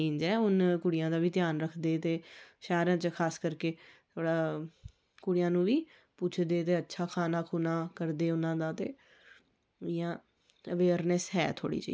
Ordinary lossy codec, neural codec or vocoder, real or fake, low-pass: none; none; real; none